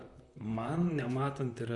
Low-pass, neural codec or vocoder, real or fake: 10.8 kHz; vocoder, 44.1 kHz, 128 mel bands, Pupu-Vocoder; fake